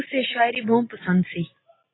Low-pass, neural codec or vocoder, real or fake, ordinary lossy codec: 7.2 kHz; none; real; AAC, 16 kbps